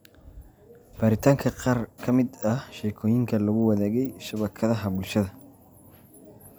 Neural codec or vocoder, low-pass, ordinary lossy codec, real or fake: none; none; none; real